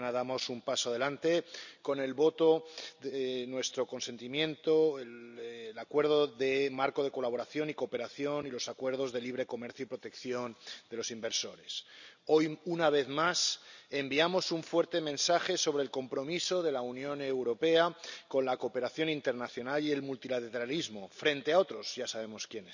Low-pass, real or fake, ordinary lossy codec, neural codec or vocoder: 7.2 kHz; real; none; none